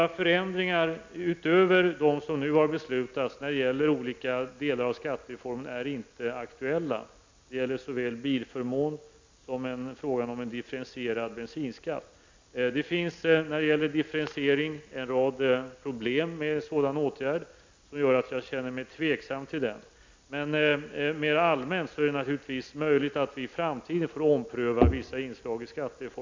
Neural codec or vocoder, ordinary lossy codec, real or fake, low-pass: none; MP3, 64 kbps; real; 7.2 kHz